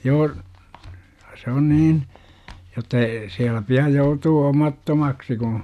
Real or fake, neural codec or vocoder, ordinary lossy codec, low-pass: real; none; none; 14.4 kHz